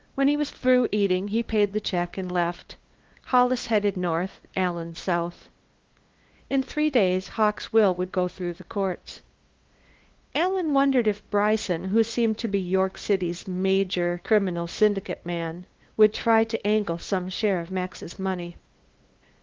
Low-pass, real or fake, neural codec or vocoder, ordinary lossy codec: 7.2 kHz; fake; codec, 16 kHz, 2 kbps, FunCodec, trained on LibriTTS, 25 frames a second; Opus, 16 kbps